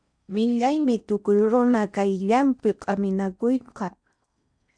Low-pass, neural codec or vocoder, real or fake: 9.9 kHz; codec, 16 kHz in and 24 kHz out, 0.6 kbps, FocalCodec, streaming, 4096 codes; fake